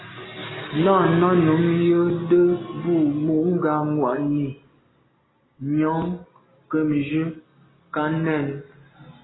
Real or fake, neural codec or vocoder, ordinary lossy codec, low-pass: real; none; AAC, 16 kbps; 7.2 kHz